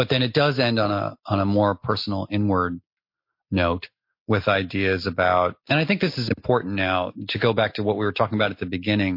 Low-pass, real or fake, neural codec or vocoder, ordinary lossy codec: 5.4 kHz; real; none; MP3, 32 kbps